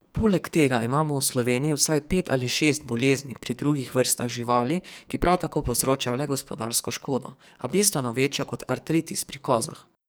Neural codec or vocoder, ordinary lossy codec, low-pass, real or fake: codec, 44.1 kHz, 2.6 kbps, SNAC; none; none; fake